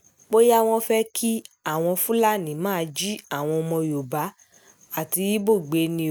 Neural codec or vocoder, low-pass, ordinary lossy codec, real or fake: none; none; none; real